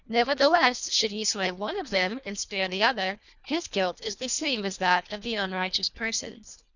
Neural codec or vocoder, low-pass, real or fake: codec, 24 kHz, 1.5 kbps, HILCodec; 7.2 kHz; fake